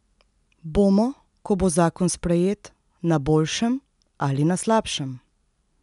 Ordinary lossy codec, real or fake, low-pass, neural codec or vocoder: none; real; 10.8 kHz; none